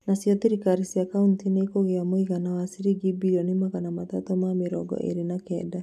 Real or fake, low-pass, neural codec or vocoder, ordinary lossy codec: real; 14.4 kHz; none; none